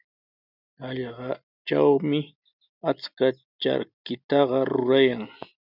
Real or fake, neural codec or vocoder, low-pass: real; none; 5.4 kHz